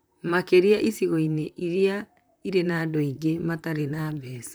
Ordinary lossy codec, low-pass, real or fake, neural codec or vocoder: none; none; fake; vocoder, 44.1 kHz, 128 mel bands, Pupu-Vocoder